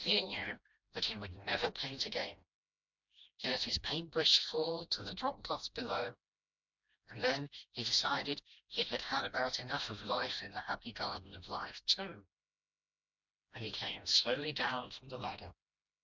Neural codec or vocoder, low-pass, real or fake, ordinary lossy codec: codec, 16 kHz, 1 kbps, FreqCodec, smaller model; 7.2 kHz; fake; MP3, 64 kbps